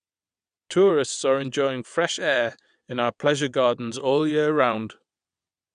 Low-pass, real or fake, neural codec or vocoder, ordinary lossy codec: 9.9 kHz; fake; vocoder, 22.05 kHz, 80 mel bands, WaveNeXt; none